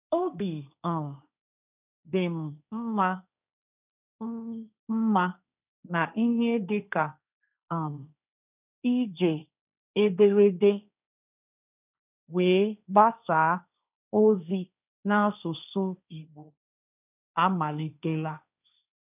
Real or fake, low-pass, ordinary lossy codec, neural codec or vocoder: fake; 3.6 kHz; none; codec, 16 kHz, 1.1 kbps, Voila-Tokenizer